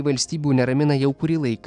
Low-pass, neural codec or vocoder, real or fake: 9.9 kHz; none; real